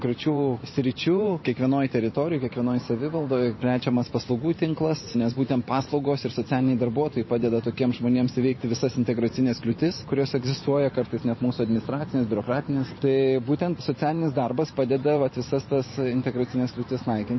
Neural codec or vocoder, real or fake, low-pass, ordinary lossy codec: vocoder, 44.1 kHz, 128 mel bands every 512 samples, BigVGAN v2; fake; 7.2 kHz; MP3, 24 kbps